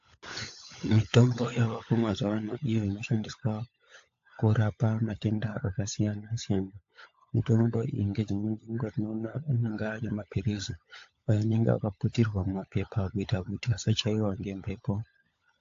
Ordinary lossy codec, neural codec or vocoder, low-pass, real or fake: AAC, 48 kbps; codec, 16 kHz, 16 kbps, FunCodec, trained on LibriTTS, 50 frames a second; 7.2 kHz; fake